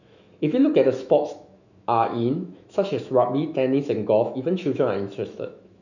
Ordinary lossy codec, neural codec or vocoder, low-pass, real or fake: none; autoencoder, 48 kHz, 128 numbers a frame, DAC-VAE, trained on Japanese speech; 7.2 kHz; fake